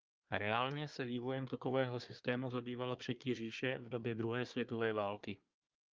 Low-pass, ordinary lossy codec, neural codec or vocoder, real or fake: 7.2 kHz; Opus, 24 kbps; codec, 24 kHz, 1 kbps, SNAC; fake